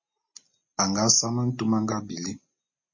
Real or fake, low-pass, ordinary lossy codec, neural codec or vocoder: real; 7.2 kHz; MP3, 32 kbps; none